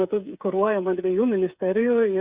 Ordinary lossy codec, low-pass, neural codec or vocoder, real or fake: Opus, 64 kbps; 3.6 kHz; codec, 16 kHz, 8 kbps, FreqCodec, smaller model; fake